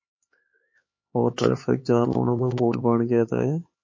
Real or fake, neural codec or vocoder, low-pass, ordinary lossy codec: fake; codec, 16 kHz, 4 kbps, X-Codec, HuBERT features, trained on LibriSpeech; 7.2 kHz; MP3, 32 kbps